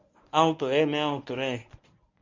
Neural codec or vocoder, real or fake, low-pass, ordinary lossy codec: codec, 24 kHz, 0.9 kbps, WavTokenizer, medium speech release version 1; fake; 7.2 kHz; MP3, 64 kbps